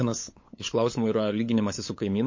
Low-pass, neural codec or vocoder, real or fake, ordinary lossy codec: 7.2 kHz; codec, 16 kHz, 8 kbps, FunCodec, trained on LibriTTS, 25 frames a second; fake; MP3, 32 kbps